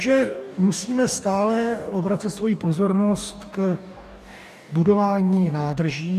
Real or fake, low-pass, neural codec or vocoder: fake; 14.4 kHz; codec, 44.1 kHz, 2.6 kbps, DAC